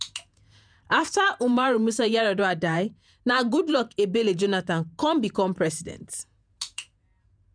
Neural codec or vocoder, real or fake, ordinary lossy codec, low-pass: vocoder, 48 kHz, 128 mel bands, Vocos; fake; none; 9.9 kHz